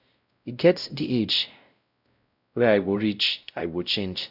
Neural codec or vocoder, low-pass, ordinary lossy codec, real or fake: codec, 16 kHz, 0.5 kbps, X-Codec, WavLM features, trained on Multilingual LibriSpeech; 5.4 kHz; none; fake